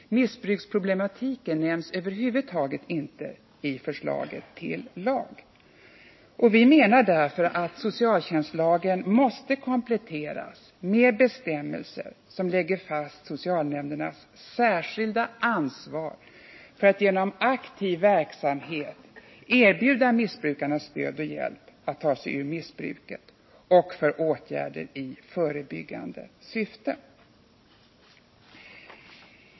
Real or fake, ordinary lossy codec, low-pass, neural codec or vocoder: real; MP3, 24 kbps; 7.2 kHz; none